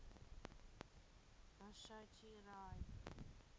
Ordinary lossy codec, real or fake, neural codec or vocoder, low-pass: none; real; none; none